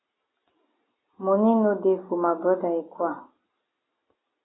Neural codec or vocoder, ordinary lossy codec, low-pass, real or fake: none; AAC, 16 kbps; 7.2 kHz; real